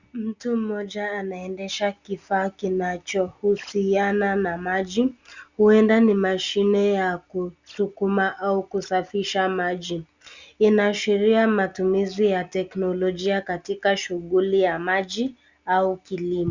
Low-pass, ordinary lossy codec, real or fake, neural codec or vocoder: 7.2 kHz; Opus, 64 kbps; real; none